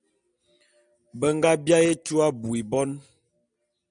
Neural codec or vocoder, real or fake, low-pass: none; real; 9.9 kHz